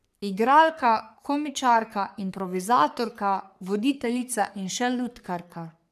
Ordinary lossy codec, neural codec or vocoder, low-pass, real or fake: none; codec, 44.1 kHz, 3.4 kbps, Pupu-Codec; 14.4 kHz; fake